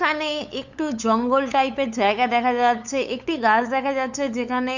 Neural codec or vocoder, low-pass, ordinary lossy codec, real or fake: codec, 16 kHz, 8 kbps, FunCodec, trained on LibriTTS, 25 frames a second; 7.2 kHz; none; fake